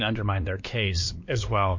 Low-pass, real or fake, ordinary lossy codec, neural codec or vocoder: 7.2 kHz; fake; MP3, 48 kbps; codec, 16 kHz, 2 kbps, X-Codec, WavLM features, trained on Multilingual LibriSpeech